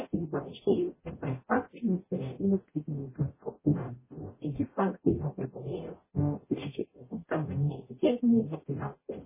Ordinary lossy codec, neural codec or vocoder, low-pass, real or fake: MP3, 16 kbps; codec, 44.1 kHz, 0.9 kbps, DAC; 3.6 kHz; fake